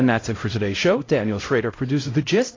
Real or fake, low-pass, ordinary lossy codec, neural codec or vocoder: fake; 7.2 kHz; AAC, 32 kbps; codec, 16 kHz, 0.5 kbps, X-Codec, HuBERT features, trained on LibriSpeech